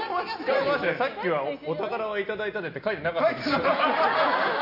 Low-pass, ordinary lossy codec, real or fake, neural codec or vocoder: 5.4 kHz; AAC, 48 kbps; real; none